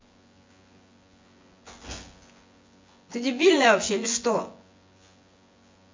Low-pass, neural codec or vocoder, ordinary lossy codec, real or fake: 7.2 kHz; vocoder, 24 kHz, 100 mel bands, Vocos; MP3, 48 kbps; fake